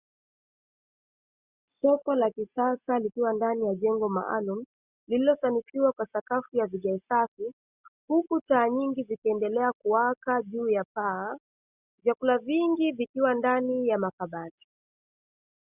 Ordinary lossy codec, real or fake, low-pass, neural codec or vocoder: Opus, 64 kbps; real; 3.6 kHz; none